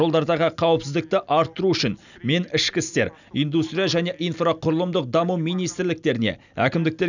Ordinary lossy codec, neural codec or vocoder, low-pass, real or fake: none; none; 7.2 kHz; real